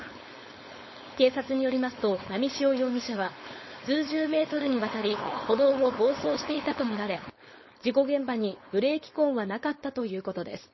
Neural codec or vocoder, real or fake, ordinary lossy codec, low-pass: codec, 16 kHz, 4.8 kbps, FACodec; fake; MP3, 24 kbps; 7.2 kHz